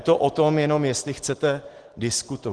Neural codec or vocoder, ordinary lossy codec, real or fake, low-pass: none; Opus, 16 kbps; real; 10.8 kHz